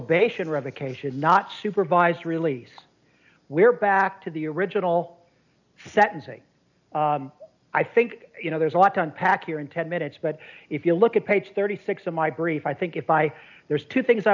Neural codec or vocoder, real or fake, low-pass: none; real; 7.2 kHz